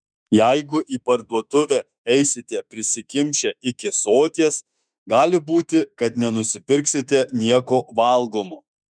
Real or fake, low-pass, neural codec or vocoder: fake; 9.9 kHz; autoencoder, 48 kHz, 32 numbers a frame, DAC-VAE, trained on Japanese speech